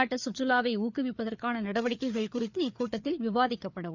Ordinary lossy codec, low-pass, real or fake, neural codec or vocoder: none; 7.2 kHz; fake; codec, 16 kHz, 4 kbps, FreqCodec, larger model